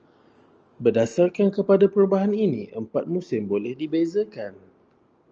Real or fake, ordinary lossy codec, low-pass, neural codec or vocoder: real; Opus, 24 kbps; 7.2 kHz; none